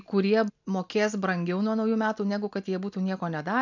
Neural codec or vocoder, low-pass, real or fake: none; 7.2 kHz; real